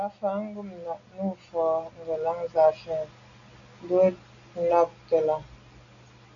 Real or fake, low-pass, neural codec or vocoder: real; 7.2 kHz; none